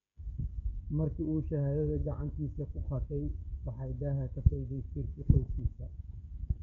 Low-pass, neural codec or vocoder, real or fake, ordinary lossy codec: 7.2 kHz; codec, 16 kHz, 16 kbps, FreqCodec, smaller model; fake; none